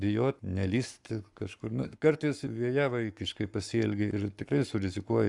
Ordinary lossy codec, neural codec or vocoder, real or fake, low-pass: Opus, 64 kbps; codec, 44.1 kHz, 7.8 kbps, Pupu-Codec; fake; 10.8 kHz